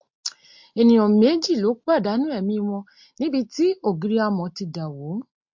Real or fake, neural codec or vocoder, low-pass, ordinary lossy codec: real; none; 7.2 kHz; MP3, 48 kbps